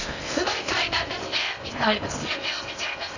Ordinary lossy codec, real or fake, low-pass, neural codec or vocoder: none; fake; 7.2 kHz; codec, 16 kHz in and 24 kHz out, 0.6 kbps, FocalCodec, streaming, 4096 codes